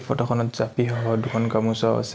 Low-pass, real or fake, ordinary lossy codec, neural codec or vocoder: none; real; none; none